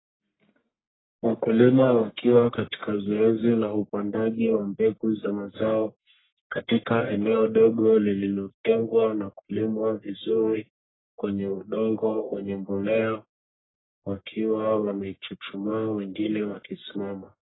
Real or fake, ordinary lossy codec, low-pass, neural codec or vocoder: fake; AAC, 16 kbps; 7.2 kHz; codec, 44.1 kHz, 1.7 kbps, Pupu-Codec